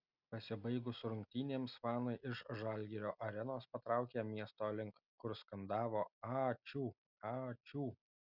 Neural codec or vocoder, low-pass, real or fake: none; 5.4 kHz; real